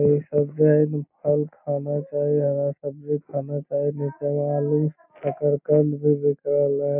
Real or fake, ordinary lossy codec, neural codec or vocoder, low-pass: real; MP3, 32 kbps; none; 3.6 kHz